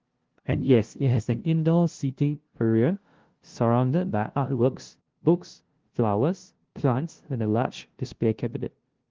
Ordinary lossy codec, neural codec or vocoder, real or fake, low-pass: Opus, 16 kbps; codec, 16 kHz, 0.5 kbps, FunCodec, trained on LibriTTS, 25 frames a second; fake; 7.2 kHz